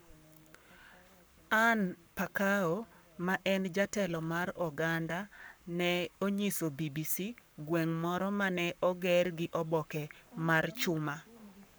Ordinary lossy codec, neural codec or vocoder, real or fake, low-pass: none; codec, 44.1 kHz, 7.8 kbps, Pupu-Codec; fake; none